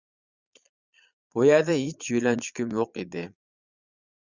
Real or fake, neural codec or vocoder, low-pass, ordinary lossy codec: fake; vocoder, 22.05 kHz, 80 mel bands, Vocos; 7.2 kHz; Opus, 64 kbps